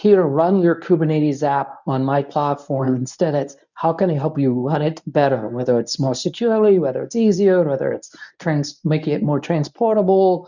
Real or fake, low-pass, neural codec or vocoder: fake; 7.2 kHz; codec, 24 kHz, 0.9 kbps, WavTokenizer, medium speech release version 1